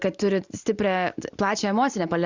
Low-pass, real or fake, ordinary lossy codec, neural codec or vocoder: 7.2 kHz; real; Opus, 64 kbps; none